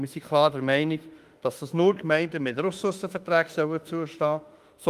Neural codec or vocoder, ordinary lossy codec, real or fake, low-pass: autoencoder, 48 kHz, 32 numbers a frame, DAC-VAE, trained on Japanese speech; Opus, 32 kbps; fake; 14.4 kHz